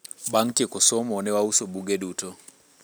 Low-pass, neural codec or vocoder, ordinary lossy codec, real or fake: none; none; none; real